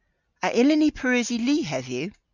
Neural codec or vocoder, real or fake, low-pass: none; real; 7.2 kHz